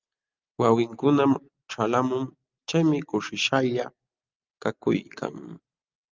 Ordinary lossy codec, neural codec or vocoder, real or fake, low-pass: Opus, 24 kbps; none; real; 7.2 kHz